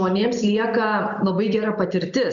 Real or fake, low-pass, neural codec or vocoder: real; 7.2 kHz; none